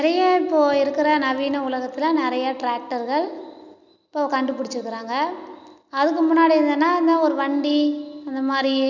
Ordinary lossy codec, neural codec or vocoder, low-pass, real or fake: none; none; 7.2 kHz; real